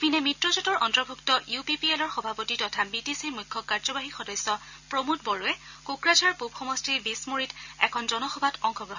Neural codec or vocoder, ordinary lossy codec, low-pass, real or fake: none; none; 7.2 kHz; real